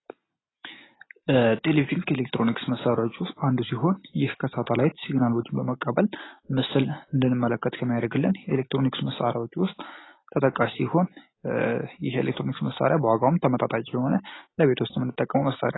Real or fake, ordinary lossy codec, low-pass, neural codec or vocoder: real; AAC, 16 kbps; 7.2 kHz; none